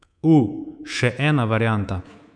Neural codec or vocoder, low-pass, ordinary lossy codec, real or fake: codec, 24 kHz, 3.1 kbps, DualCodec; 9.9 kHz; none; fake